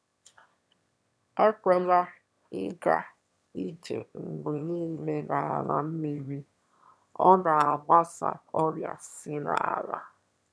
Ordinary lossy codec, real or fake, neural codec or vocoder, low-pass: none; fake; autoencoder, 22.05 kHz, a latent of 192 numbers a frame, VITS, trained on one speaker; none